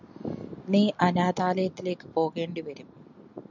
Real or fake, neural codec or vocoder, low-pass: real; none; 7.2 kHz